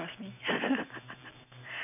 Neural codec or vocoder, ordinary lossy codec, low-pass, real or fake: none; none; 3.6 kHz; real